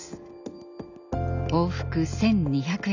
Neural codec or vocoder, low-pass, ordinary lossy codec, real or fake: none; 7.2 kHz; none; real